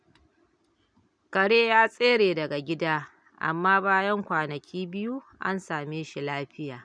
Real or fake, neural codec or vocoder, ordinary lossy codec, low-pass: real; none; none; 9.9 kHz